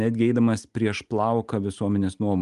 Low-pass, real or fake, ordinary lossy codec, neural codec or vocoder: 10.8 kHz; real; Opus, 32 kbps; none